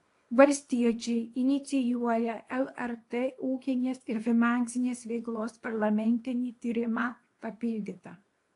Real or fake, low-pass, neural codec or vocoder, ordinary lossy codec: fake; 10.8 kHz; codec, 24 kHz, 0.9 kbps, WavTokenizer, small release; AAC, 48 kbps